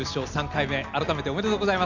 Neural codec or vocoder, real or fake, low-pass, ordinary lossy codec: none; real; 7.2 kHz; Opus, 64 kbps